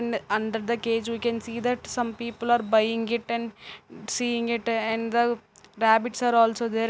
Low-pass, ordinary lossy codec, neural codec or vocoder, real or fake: none; none; none; real